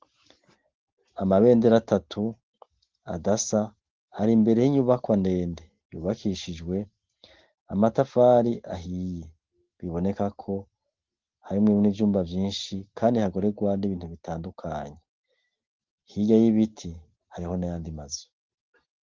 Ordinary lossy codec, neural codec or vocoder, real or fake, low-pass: Opus, 16 kbps; none; real; 7.2 kHz